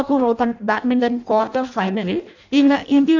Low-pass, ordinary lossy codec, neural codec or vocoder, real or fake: 7.2 kHz; none; codec, 16 kHz in and 24 kHz out, 0.6 kbps, FireRedTTS-2 codec; fake